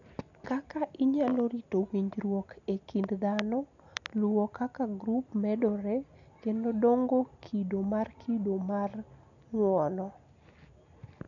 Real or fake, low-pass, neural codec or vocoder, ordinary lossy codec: real; 7.2 kHz; none; none